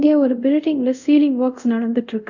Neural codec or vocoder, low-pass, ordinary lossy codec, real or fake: codec, 24 kHz, 0.5 kbps, DualCodec; 7.2 kHz; none; fake